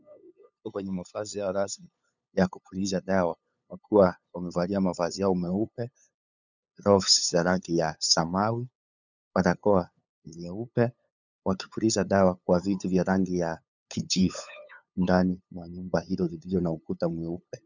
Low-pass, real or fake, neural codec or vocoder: 7.2 kHz; fake; codec, 16 kHz, 8 kbps, FunCodec, trained on LibriTTS, 25 frames a second